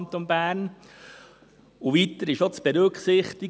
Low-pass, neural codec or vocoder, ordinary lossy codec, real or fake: none; none; none; real